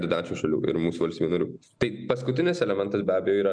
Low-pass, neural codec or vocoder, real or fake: 9.9 kHz; none; real